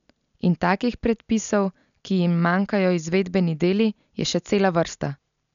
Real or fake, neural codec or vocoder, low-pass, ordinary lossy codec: real; none; 7.2 kHz; none